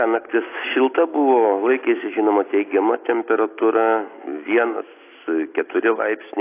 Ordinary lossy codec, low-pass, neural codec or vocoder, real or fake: AAC, 24 kbps; 3.6 kHz; none; real